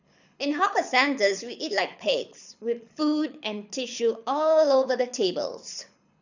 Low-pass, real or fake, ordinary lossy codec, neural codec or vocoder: 7.2 kHz; fake; none; codec, 24 kHz, 6 kbps, HILCodec